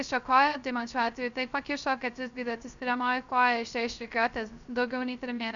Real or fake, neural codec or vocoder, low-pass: fake; codec, 16 kHz, 0.3 kbps, FocalCodec; 7.2 kHz